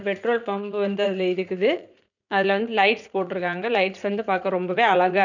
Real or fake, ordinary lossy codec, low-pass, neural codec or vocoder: fake; none; 7.2 kHz; vocoder, 44.1 kHz, 80 mel bands, Vocos